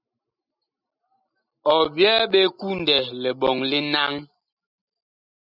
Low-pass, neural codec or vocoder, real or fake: 5.4 kHz; none; real